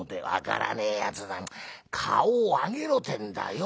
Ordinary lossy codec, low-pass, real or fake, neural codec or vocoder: none; none; real; none